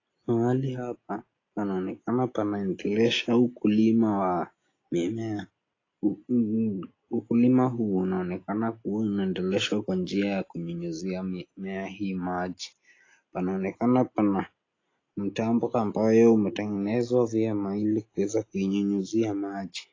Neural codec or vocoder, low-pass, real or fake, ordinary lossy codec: none; 7.2 kHz; real; AAC, 32 kbps